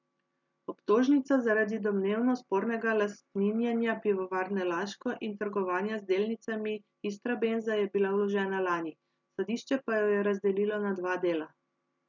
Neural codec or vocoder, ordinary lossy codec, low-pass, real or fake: none; none; 7.2 kHz; real